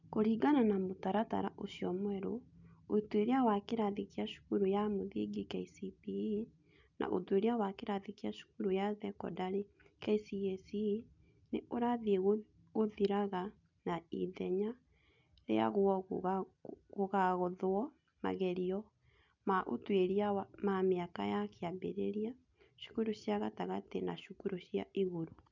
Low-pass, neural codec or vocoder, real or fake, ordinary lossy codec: 7.2 kHz; none; real; none